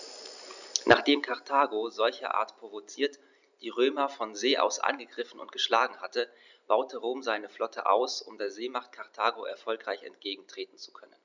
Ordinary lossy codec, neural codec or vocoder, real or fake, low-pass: none; none; real; 7.2 kHz